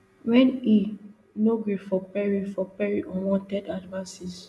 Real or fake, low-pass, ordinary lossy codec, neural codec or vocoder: real; none; none; none